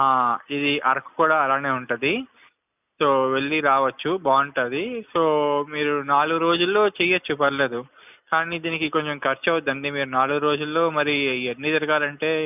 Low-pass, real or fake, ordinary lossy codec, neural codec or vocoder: 3.6 kHz; real; none; none